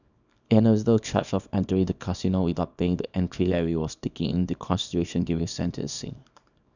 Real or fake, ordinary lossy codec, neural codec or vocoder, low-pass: fake; none; codec, 24 kHz, 0.9 kbps, WavTokenizer, small release; 7.2 kHz